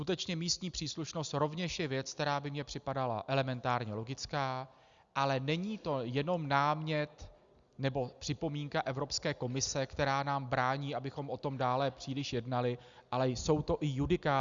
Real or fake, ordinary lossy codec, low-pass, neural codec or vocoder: real; Opus, 64 kbps; 7.2 kHz; none